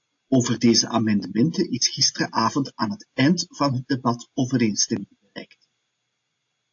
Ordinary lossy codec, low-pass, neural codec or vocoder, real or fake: AAC, 48 kbps; 7.2 kHz; none; real